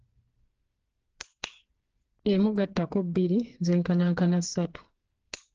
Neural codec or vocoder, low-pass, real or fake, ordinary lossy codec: codec, 16 kHz, 4 kbps, FreqCodec, smaller model; 7.2 kHz; fake; Opus, 16 kbps